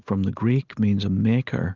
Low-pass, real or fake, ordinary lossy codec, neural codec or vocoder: 7.2 kHz; real; Opus, 32 kbps; none